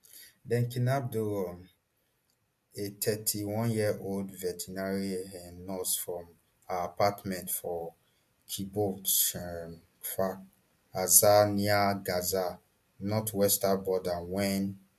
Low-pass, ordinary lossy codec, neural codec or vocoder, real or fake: 14.4 kHz; MP3, 96 kbps; none; real